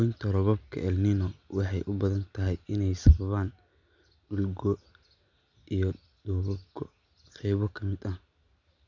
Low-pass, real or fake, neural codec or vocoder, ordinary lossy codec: 7.2 kHz; real; none; none